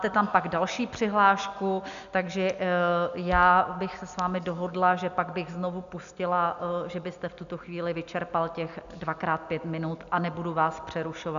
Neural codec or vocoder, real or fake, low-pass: none; real; 7.2 kHz